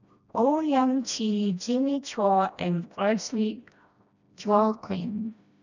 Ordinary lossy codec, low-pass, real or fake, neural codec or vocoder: none; 7.2 kHz; fake; codec, 16 kHz, 1 kbps, FreqCodec, smaller model